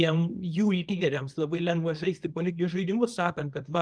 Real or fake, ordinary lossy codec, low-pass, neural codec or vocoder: fake; Opus, 32 kbps; 9.9 kHz; codec, 24 kHz, 0.9 kbps, WavTokenizer, small release